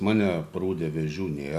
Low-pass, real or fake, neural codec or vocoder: 14.4 kHz; real; none